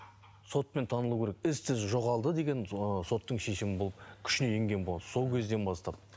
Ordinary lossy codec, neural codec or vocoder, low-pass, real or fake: none; none; none; real